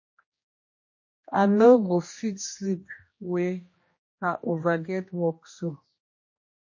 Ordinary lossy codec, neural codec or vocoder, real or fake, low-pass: MP3, 32 kbps; codec, 16 kHz, 1 kbps, X-Codec, HuBERT features, trained on general audio; fake; 7.2 kHz